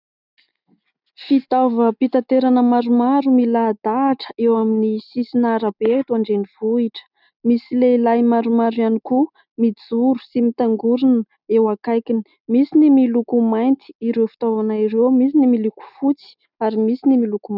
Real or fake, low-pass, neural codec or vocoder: real; 5.4 kHz; none